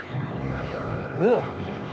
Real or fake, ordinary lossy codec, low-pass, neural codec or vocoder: fake; none; none; codec, 16 kHz, 2 kbps, X-Codec, HuBERT features, trained on LibriSpeech